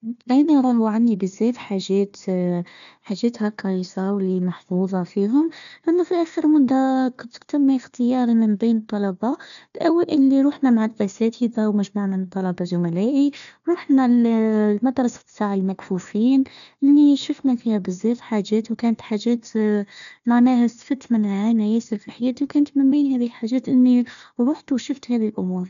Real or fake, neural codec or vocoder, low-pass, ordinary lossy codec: fake; codec, 16 kHz, 1 kbps, FunCodec, trained on Chinese and English, 50 frames a second; 7.2 kHz; none